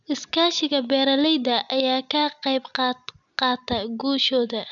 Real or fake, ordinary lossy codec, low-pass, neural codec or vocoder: real; none; 7.2 kHz; none